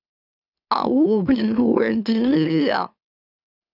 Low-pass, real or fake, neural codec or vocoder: 5.4 kHz; fake; autoencoder, 44.1 kHz, a latent of 192 numbers a frame, MeloTTS